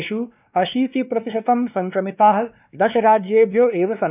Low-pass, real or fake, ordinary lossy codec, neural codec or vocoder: 3.6 kHz; fake; none; codec, 16 kHz, 2 kbps, X-Codec, WavLM features, trained on Multilingual LibriSpeech